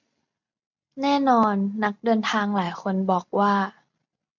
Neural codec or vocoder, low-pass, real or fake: none; 7.2 kHz; real